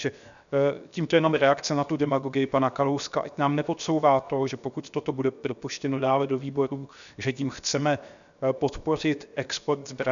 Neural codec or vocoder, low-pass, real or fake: codec, 16 kHz, 0.7 kbps, FocalCodec; 7.2 kHz; fake